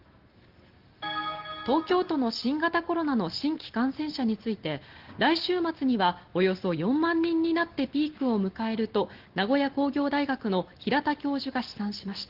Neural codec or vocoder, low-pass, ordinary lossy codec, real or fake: none; 5.4 kHz; Opus, 16 kbps; real